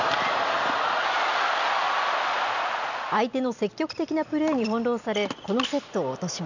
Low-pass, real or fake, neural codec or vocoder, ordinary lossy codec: 7.2 kHz; real; none; none